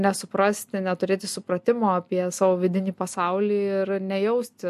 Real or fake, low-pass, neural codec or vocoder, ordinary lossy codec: real; 14.4 kHz; none; MP3, 64 kbps